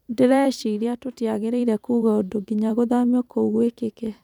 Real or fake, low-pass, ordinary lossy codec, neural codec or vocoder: fake; 19.8 kHz; none; vocoder, 44.1 kHz, 128 mel bands every 512 samples, BigVGAN v2